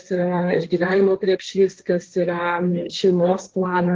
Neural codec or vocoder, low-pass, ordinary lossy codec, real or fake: codec, 16 kHz, 1.1 kbps, Voila-Tokenizer; 7.2 kHz; Opus, 16 kbps; fake